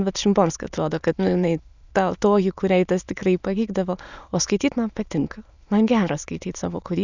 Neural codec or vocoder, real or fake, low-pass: autoencoder, 22.05 kHz, a latent of 192 numbers a frame, VITS, trained on many speakers; fake; 7.2 kHz